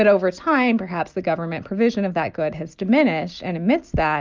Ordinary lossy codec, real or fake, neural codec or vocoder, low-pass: Opus, 24 kbps; real; none; 7.2 kHz